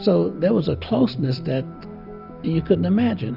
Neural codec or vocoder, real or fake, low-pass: none; real; 5.4 kHz